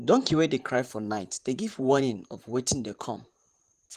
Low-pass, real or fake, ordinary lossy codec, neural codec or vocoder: 14.4 kHz; fake; Opus, 24 kbps; vocoder, 44.1 kHz, 128 mel bands, Pupu-Vocoder